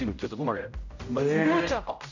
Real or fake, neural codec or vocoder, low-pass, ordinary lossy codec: fake; codec, 16 kHz, 0.5 kbps, X-Codec, HuBERT features, trained on general audio; 7.2 kHz; none